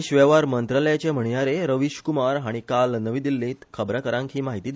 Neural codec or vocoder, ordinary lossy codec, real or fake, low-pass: none; none; real; none